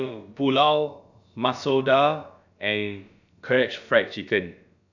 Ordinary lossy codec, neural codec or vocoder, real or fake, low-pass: none; codec, 16 kHz, about 1 kbps, DyCAST, with the encoder's durations; fake; 7.2 kHz